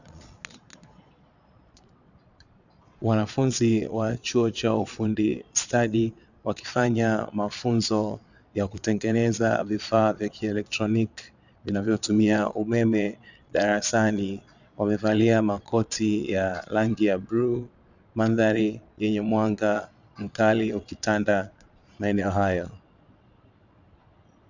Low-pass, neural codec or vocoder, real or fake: 7.2 kHz; vocoder, 22.05 kHz, 80 mel bands, Vocos; fake